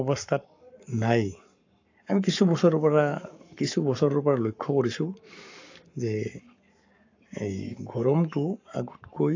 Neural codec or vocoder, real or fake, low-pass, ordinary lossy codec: codec, 44.1 kHz, 7.8 kbps, Pupu-Codec; fake; 7.2 kHz; AAC, 48 kbps